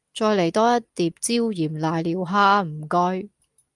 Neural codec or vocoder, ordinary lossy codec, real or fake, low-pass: none; Opus, 32 kbps; real; 10.8 kHz